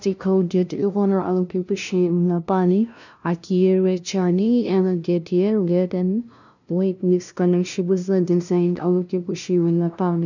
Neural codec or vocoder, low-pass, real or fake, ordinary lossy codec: codec, 16 kHz, 0.5 kbps, FunCodec, trained on LibriTTS, 25 frames a second; 7.2 kHz; fake; none